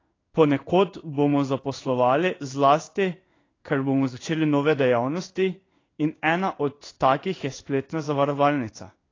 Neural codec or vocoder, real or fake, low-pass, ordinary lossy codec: codec, 16 kHz in and 24 kHz out, 1 kbps, XY-Tokenizer; fake; 7.2 kHz; AAC, 32 kbps